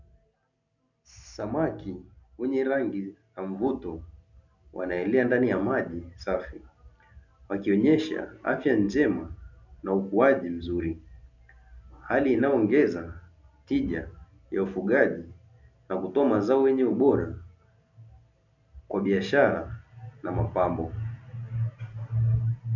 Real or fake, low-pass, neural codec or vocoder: real; 7.2 kHz; none